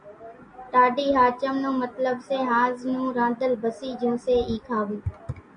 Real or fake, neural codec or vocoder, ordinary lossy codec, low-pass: real; none; MP3, 64 kbps; 9.9 kHz